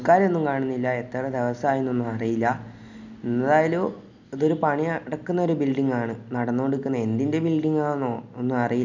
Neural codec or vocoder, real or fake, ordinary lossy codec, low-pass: none; real; none; 7.2 kHz